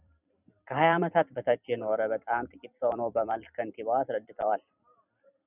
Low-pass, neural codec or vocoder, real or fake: 3.6 kHz; vocoder, 44.1 kHz, 128 mel bands every 256 samples, BigVGAN v2; fake